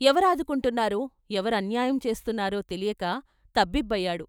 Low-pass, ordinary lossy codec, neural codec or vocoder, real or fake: none; none; autoencoder, 48 kHz, 128 numbers a frame, DAC-VAE, trained on Japanese speech; fake